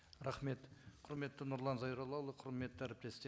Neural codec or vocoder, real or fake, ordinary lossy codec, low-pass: none; real; none; none